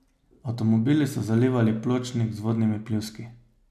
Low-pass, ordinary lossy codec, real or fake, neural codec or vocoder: 14.4 kHz; none; real; none